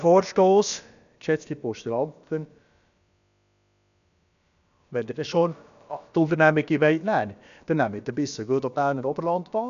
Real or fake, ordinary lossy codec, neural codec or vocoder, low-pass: fake; none; codec, 16 kHz, about 1 kbps, DyCAST, with the encoder's durations; 7.2 kHz